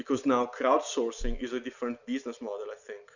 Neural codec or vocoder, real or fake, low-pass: none; real; 7.2 kHz